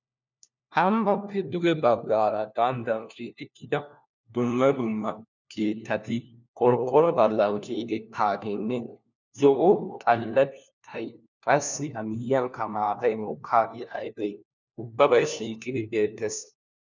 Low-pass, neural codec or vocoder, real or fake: 7.2 kHz; codec, 16 kHz, 1 kbps, FunCodec, trained on LibriTTS, 50 frames a second; fake